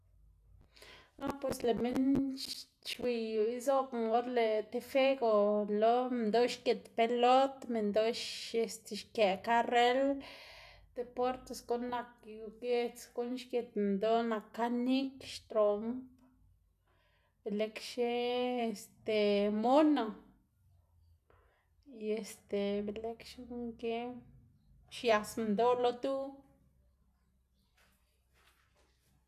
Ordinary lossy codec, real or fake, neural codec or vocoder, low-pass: none; real; none; 14.4 kHz